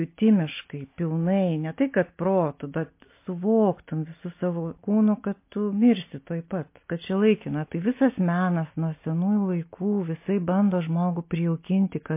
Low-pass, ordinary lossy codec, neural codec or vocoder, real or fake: 3.6 kHz; MP3, 24 kbps; none; real